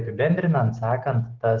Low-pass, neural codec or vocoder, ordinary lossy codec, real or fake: 7.2 kHz; none; Opus, 16 kbps; real